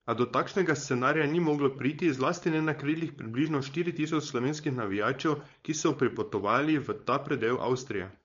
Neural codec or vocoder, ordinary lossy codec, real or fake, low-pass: codec, 16 kHz, 4.8 kbps, FACodec; MP3, 48 kbps; fake; 7.2 kHz